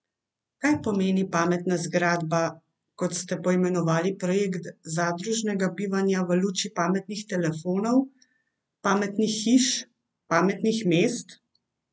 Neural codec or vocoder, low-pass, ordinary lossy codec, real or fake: none; none; none; real